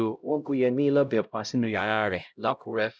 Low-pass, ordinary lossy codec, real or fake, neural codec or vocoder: none; none; fake; codec, 16 kHz, 0.5 kbps, X-Codec, HuBERT features, trained on LibriSpeech